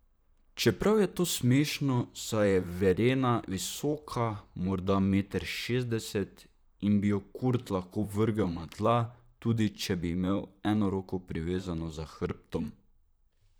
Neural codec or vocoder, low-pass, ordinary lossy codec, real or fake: vocoder, 44.1 kHz, 128 mel bands, Pupu-Vocoder; none; none; fake